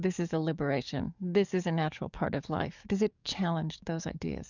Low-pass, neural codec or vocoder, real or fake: 7.2 kHz; codec, 44.1 kHz, 7.8 kbps, DAC; fake